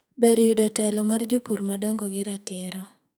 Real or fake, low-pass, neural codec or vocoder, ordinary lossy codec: fake; none; codec, 44.1 kHz, 2.6 kbps, SNAC; none